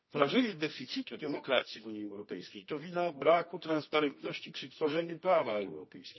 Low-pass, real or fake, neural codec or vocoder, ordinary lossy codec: 7.2 kHz; fake; codec, 24 kHz, 0.9 kbps, WavTokenizer, medium music audio release; MP3, 24 kbps